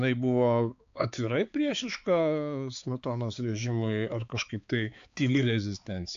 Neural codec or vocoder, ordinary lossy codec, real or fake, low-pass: codec, 16 kHz, 4 kbps, X-Codec, HuBERT features, trained on balanced general audio; AAC, 64 kbps; fake; 7.2 kHz